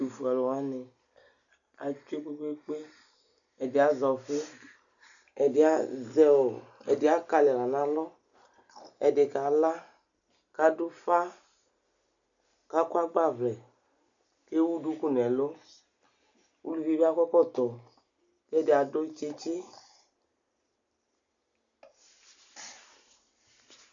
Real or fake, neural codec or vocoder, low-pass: real; none; 7.2 kHz